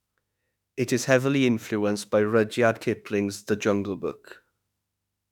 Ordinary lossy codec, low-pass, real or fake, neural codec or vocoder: none; 19.8 kHz; fake; autoencoder, 48 kHz, 32 numbers a frame, DAC-VAE, trained on Japanese speech